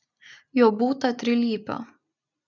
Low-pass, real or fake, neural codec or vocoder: 7.2 kHz; real; none